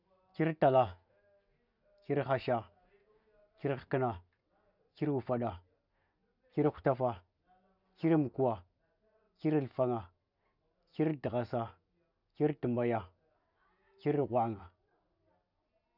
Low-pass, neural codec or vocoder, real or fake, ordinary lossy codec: 5.4 kHz; none; real; none